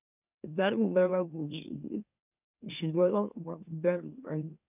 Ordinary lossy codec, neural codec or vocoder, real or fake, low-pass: none; autoencoder, 44.1 kHz, a latent of 192 numbers a frame, MeloTTS; fake; 3.6 kHz